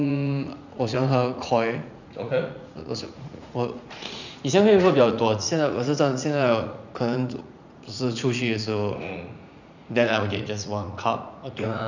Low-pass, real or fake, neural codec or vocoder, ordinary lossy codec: 7.2 kHz; fake; vocoder, 44.1 kHz, 80 mel bands, Vocos; none